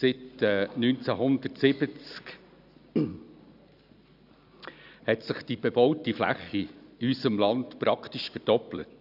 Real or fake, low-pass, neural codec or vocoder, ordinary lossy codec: real; 5.4 kHz; none; none